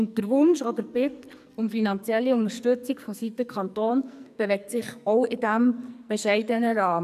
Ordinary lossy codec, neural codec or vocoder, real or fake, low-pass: none; codec, 44.1 kHz, 2.6 kbps, SNAC; fake; 14.4 kHz